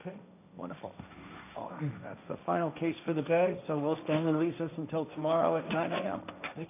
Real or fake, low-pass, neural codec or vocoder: fake; 3.6 kHz; codec, 16 kHz, 1.1 kbps, Voila-Tokenizer